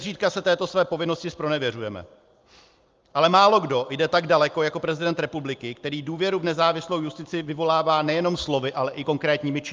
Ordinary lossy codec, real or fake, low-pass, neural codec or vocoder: Opus, 24 kbps; real; 7.2 kHz; none